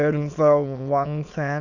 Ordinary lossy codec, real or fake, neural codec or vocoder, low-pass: none; fake; autoencoder, 22.05 kHz, a latent of 192 numbers a frame, VITS, trained on many speakers; 7.2 kHz